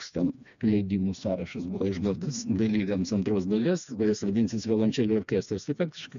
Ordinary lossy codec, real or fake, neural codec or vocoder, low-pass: MP3, 96 kbps; fake; codec, 16 kHz, 2 kbps, FreqCodec, smaller model; 7.2 kHz